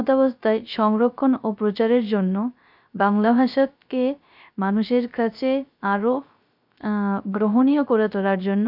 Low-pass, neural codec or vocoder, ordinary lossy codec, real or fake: 5.4 kHz; codec, 16 kHz, 0.3 kbps, FocalCodec; none; fake